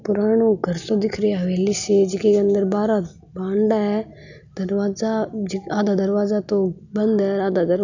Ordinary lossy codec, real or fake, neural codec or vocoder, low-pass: none; real; none; 7.2 kHz